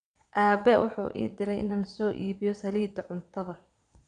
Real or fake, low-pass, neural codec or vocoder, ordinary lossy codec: fake; 9.9 kHz; vocoder, 22.05 kHz, 80 mel bands, Vocos; none